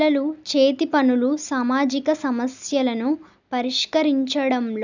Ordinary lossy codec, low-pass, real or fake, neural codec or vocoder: none; 7.2 kHz; real; none